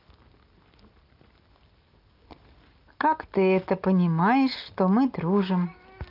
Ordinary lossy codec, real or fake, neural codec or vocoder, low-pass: Opus, 24 kbps; real; none; 5.4 kHz